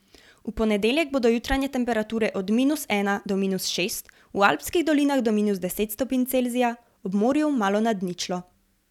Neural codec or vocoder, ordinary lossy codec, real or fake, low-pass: none; none; real; 19.8 kHz